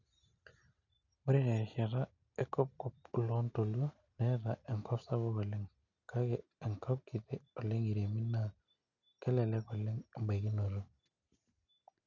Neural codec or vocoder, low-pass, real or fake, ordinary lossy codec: none; 7.2 kHz; real; none